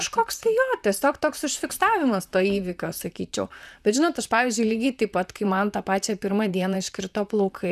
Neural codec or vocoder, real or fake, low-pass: vocoder, 44.1 kHz, 128 mel bands, Pupu-Vocoder; fake; 14.4 kHz